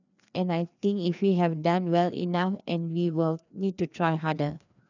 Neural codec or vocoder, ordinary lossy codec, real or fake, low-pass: codec, 16 kHz, 2 kbps, FreqCodec, larger model; none; fake; 7.2 kHz